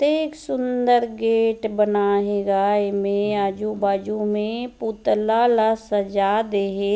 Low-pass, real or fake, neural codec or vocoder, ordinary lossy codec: none; real; none; none